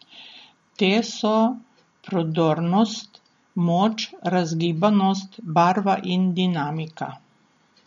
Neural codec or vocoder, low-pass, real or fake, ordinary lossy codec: none; 19.8 kHz; real; MP3, 48 kbps